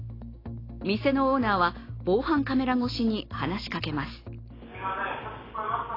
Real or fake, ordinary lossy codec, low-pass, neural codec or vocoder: real; AAC, 24 kbps; 5.4 kHz; none